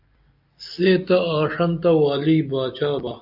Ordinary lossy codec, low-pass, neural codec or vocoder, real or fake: AAC, 48 kbps; 5.4 kHz; none; real